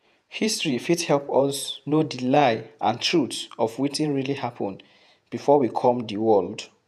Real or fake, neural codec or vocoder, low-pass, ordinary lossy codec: fake; vocoder, 48 kHz, 128 mel bands, Vocos; 14.4 kHz; none